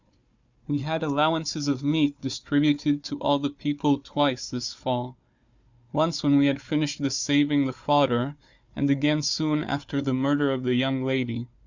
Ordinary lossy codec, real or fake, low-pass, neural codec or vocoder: Opus, 64 kbps; fake; 7.2 kHz; codec, 16 kHz, 4 kbps, FunCodec, trained on Chinese and English, 50 frames a second